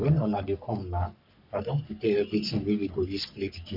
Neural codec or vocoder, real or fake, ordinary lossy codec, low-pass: codec, 44.1 kHz, 3.4 kbps, Pupu-Codec; fake; none; 5.4 kHz